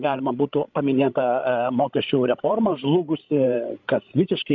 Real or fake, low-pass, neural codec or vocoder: fake; 7.2 kHz; codec, 16 kHz, 16 kbps, FreqCodec, larger model